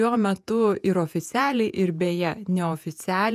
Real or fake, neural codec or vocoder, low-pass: fake; vocoder, 48 kHz, 128 mel bands, Vocos; 14.4 kHz